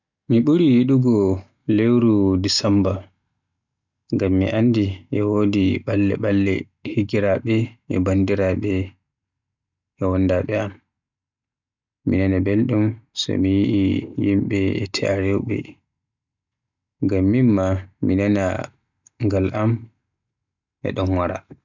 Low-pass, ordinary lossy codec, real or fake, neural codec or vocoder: 7.2 kHz; none; real; none